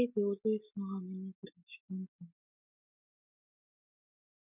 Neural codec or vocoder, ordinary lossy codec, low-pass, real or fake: none; none; 3.6 kHz; real